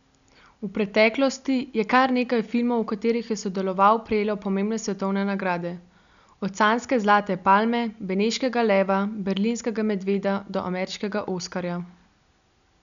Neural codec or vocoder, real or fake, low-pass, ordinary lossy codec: none; real; 7.2 kHz; none